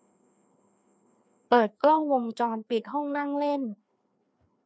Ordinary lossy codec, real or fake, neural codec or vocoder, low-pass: none; fake; codec, 16 kHz, 2 kbps, FreqCodec, larger model; none